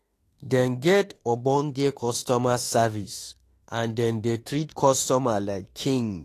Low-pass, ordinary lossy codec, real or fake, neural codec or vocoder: 14.4 kHz; AAC, 48 kbps; fake; autoencoder, 48 kHz, 32 numbers a frame, DAC-VAE, trained on Japanese speech